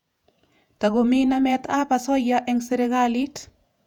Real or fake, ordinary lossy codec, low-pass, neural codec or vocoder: fake; none; 19.8 kHz; vocoder, 48 kHz, 128 mel bands, Vocos